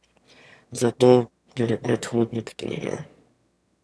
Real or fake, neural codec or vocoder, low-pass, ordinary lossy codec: fake; autoencoder, 22.05 kHz, a latent of 192 numbers a frame, VITS, trained on one speaker; none; none